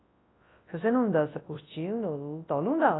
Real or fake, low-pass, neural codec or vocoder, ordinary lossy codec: fake; 7.2 kHz; codec, 24 kHz, 0.9 kbps, WavTokenizer, large speech release; AAC, 16 kbps